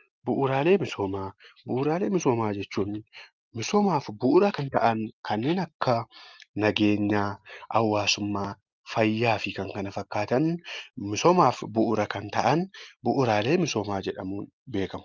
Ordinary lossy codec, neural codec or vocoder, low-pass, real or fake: Opus, 24 kbps; none; 7.2 kHz; real